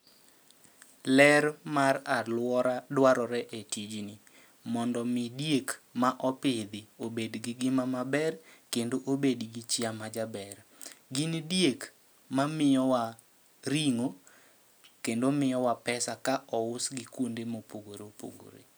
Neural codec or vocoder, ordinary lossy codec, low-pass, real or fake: none; none; none; real